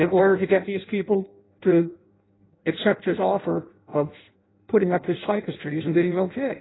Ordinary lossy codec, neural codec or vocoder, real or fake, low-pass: AAC, 16 kbps; codec, 16 kHz in and 24 kHz out, 0.6 kbps, FireRedTTS-2 codec; fake; 7.2 kHz